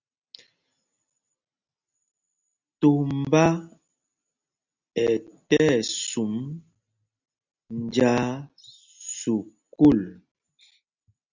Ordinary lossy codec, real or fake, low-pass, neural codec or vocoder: Opus, 64 kbps; real; 7.2 kHz; none